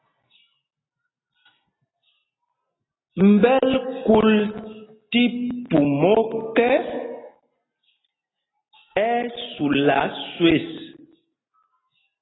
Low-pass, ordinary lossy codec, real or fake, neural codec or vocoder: 7.2 kHz; AAC, 16 kbps; real; none